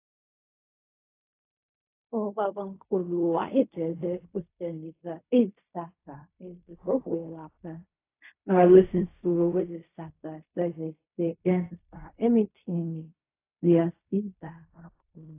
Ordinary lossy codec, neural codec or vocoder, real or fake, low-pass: AAC, 24 kbps; codec, 16 kHz in and 24 kHz out, 0.4 kbps, LongCat-Audio-Codec, fine tuned four codebook decoder; fake; 3.6 kHz